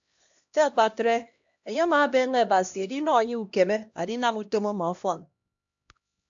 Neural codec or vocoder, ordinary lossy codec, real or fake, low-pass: codec, 16 kHz, 1 kbps, X-Codec, HuBERT features, trained on LibriSpeech; AAC, 64 kbps; fake; 7.2 kHz